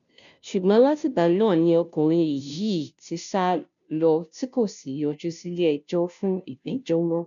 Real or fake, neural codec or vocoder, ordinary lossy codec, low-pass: fake; codec, 16 kHz, 0.5 kbps, FunCodec, trained on Chinese and English, 25 frames a second; none; 7.2 kHz